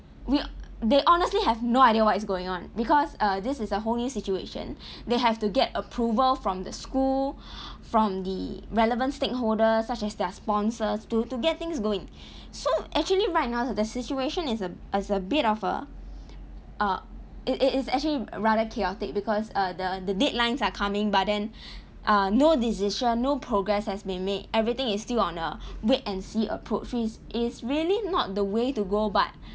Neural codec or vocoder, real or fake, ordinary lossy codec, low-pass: none; real; none; none